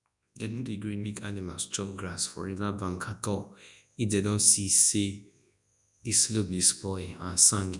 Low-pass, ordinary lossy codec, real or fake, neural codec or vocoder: 10.8 kHz; none; fake; codec, 24 kHz, 0.9 kbps, WavTokenizer, large speech release